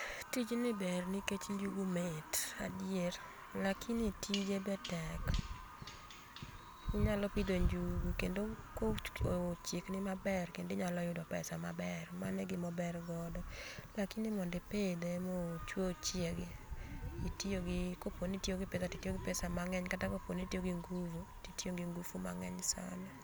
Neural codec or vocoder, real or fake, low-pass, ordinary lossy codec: none; real; none; none